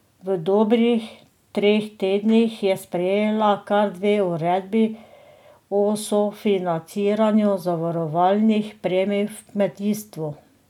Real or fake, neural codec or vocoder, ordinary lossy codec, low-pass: real; none; none; 19.8 kHz